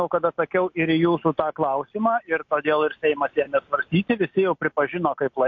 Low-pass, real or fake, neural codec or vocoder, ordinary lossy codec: 7.2 kHz; real; none; MP3, 48 kbps